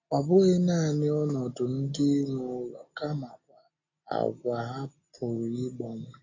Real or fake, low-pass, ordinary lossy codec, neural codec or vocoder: real; 7.2 kHz; AAC, 32 kbps; none